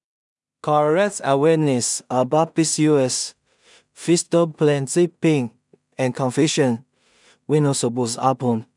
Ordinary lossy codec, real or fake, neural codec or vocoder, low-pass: none; fake; codec, 16 kHz in and 24 kHz out, 0.4 kbps, LongCat-Audio-Codec, two codebook decoder; 10.8 kHz